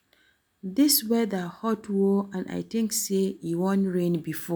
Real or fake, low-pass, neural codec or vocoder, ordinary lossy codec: real; none; none; none